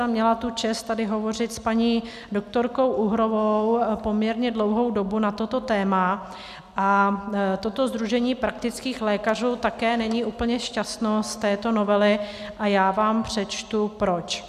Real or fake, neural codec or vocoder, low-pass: real; none; 14.4 kHz